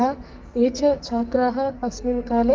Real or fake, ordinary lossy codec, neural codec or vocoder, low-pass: fake; Opus, 24 kbps; codec, 44.1 kHz, 2.6 kbps, SNAC; 7.2 kHz